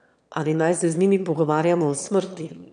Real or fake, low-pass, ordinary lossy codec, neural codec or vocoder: fake; 9.9 kHz; none; autoencoder, 22.05 kHz, a latent of 192 numbers a frame, VITS, trained on one speaker